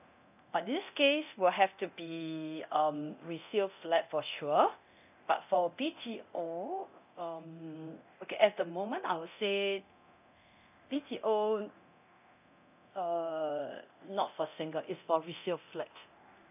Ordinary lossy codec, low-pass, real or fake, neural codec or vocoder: none; 3.6 kHz; fake; codec, 24 kHz, 0.9 kbps, DualCodec